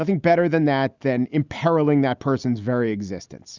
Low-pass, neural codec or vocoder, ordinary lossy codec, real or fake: 7.2 kHz; none; Opus, 64 kbps; real